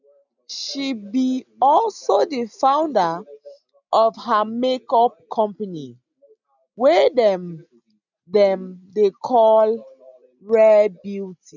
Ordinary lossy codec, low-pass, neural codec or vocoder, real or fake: none; 7.2 kHz; none; real